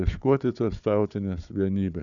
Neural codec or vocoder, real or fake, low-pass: codec, 16 kHz, 4 kbps, FunCodec, trained on Chinese and English, 50 frames a second; fake; 7.2 kHz